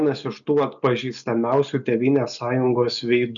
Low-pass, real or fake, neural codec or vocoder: 7.2 kHz; real; none